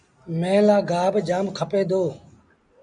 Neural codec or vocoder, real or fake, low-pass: none; real; 9.9 kHz